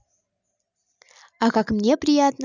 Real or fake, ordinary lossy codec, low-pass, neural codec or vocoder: real; none; 7.2 kHz; none